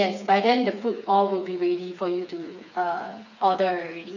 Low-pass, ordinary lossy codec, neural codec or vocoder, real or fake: 7.2 kHz; none; codec, 16 kHz, 4 kbps, FreqCodec, smaller model; fake